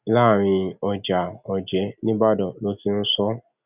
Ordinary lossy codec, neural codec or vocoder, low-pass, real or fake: none; none; 3.6 kHz; real